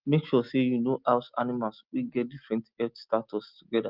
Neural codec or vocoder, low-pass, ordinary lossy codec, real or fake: none; 5.4 kHz; Opus, 32 kbps; real